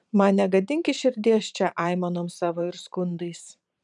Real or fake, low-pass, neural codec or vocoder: fake; 10.8 kHz; vocoder, 44.1 kHz, 128 mel bands every 512 samples, BigVGAN v2